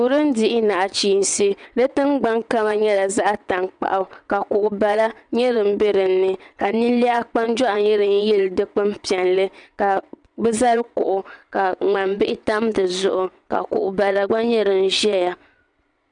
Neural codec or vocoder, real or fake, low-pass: vocoder, 22.05 kHz, 80 mel bands, WaveNeXt; fake; 9.9 kHz